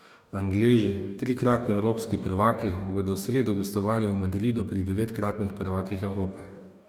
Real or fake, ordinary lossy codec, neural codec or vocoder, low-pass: fake; none; codec, 44.1 kHz, 2.6 kbps, DAC; 19.8 kHz